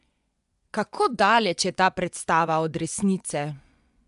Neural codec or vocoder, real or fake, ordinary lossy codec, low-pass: vocoder, 24 kHz, 100 mel bands, Vocos; fake; none; 10.8 kHz